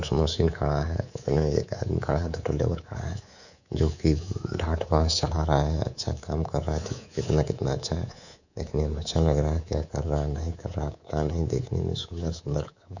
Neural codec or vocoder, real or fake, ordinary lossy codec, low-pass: codec, 24 kHz, 3.1 kbps, DualCodec; fake; none; 7.2 kHz